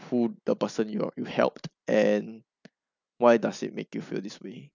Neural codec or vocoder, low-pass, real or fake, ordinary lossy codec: none; 7.2 kHz; real; none